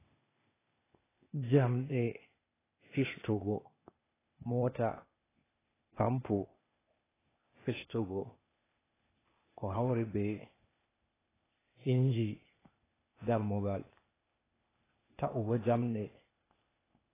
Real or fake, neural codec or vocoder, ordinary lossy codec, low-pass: fake; codec, 16 kHz, 0.8 kbps, ZipCodec; AAC, 16 kbps; 3.6 kHz